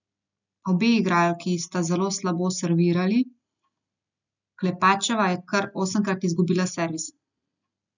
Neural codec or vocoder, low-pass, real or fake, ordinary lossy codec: none; 7.2 kHz; real; none